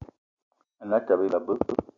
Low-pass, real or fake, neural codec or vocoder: 7.2 kHz; real; none